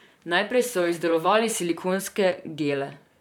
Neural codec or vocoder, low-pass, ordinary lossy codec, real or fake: vocoder, 44.1 kHz, 128 mel bands, Pupu-Vocoder; 19.8 kHz; none; fake